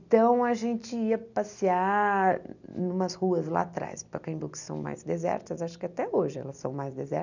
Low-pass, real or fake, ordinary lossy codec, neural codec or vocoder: 7.2 kHz; real; none; none